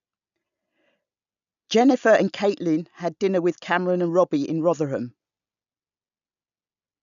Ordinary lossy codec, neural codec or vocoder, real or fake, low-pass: none; none; real; 7.2 kHz